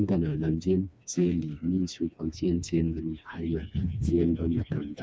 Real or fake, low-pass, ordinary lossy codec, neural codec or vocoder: fake; none; none; codec, 16 kHz, 2 kbps, FreqCodec, smaller model